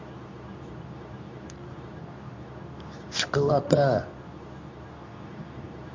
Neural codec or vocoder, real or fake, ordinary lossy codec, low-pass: codec, 24 kHz, 0.9 kbps, WavTokenizer, medium speech release version 2; fake; MP3, 48 kbps; 7.2 kHz